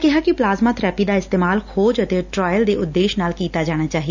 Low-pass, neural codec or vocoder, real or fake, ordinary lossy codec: 7.2 kHz; none; real; none